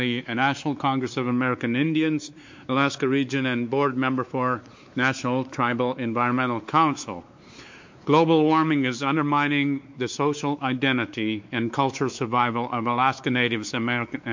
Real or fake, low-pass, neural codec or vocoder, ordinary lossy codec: fake; 7.2 kHz; codec, 16 kHz, 4 kbps, X-Codec, WavLM features, trained on Multilingual LibriSpeech; MP3, 48 kbps